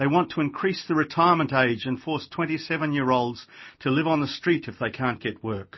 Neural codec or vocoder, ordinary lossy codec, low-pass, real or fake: none; MP3, 24 kbps; 7.2 kHz; real